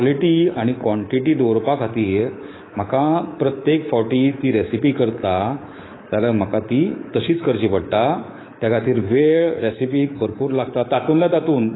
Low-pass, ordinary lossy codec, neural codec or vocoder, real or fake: 7.2 kHz; AAC, 16 kbps; codec, 24 kHz, 3.1 kbps, DualCodec; fake